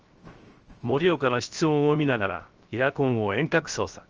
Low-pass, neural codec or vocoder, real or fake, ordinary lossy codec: 7.2 kHz; codec, 16 kHz, 0.7 kbps, FocalCodec; fake; Opus, 24 kbps